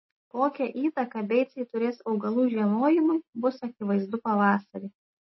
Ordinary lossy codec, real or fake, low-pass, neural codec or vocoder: MP3, 24 kbps; real; 7.2 kHz; none